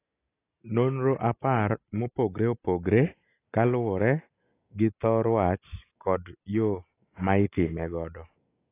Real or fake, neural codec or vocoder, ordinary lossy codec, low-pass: real; none; AAC, 24 kbps; 3.6 kHz